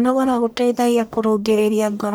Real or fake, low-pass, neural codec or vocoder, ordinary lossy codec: fake; none; codec, 44.1 kHz, 1.7 kbps, Pupu-Codec; none